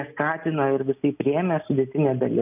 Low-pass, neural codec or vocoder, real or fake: 3.6 kHz; none; real